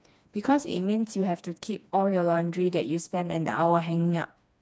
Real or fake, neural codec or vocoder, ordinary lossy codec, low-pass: fake; codec, 16 kHz, 2 kbps, FreqCodec, smaller model; none; none